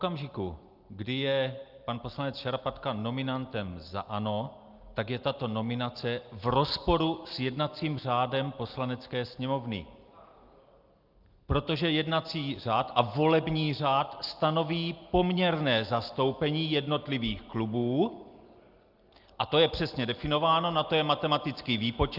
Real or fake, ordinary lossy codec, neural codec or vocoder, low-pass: real; Opus, 24 kbps; none; 5.4 kHz